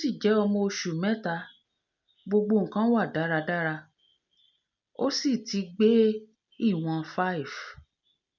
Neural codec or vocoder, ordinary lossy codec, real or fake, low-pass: none; none; real; 7.2 kHz